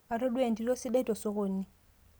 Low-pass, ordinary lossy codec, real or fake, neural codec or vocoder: none; none; real; none